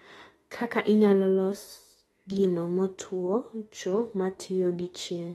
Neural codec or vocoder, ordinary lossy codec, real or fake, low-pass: autoencoder, 48 kHz, 32 numbers a frame, DAC-VAE, trained on Japanese speech; AAC, 32 kbps; fake; 19.8 kHz